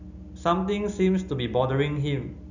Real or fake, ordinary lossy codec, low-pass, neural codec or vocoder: real; none; 7.2 kHz; none